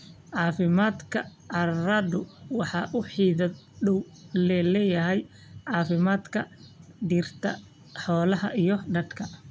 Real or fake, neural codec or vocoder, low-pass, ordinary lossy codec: real; none; none; none